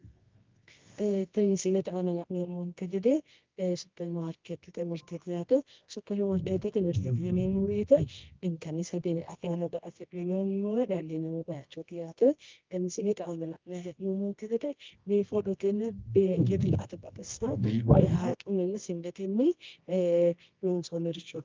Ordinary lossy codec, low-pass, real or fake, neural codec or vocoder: Opus, 32 kbps; 7.2 kHz; fake; codec, 24 kHz, 0.9 kbps, WavTokenizer, medium music audio release